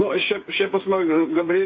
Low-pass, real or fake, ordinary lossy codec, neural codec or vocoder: 7.2 kHz; fake; AAC, 32 kbps; codec, 16 kHz, 8 kbps, FreqCodec, smaller model